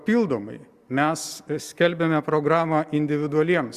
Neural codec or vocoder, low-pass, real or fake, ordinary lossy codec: none; 14.4 kHz; real; Opus, 64 kbps